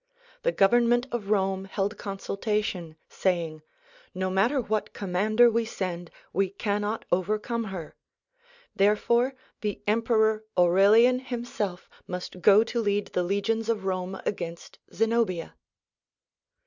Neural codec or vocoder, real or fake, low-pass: none; real; 7.2 kHz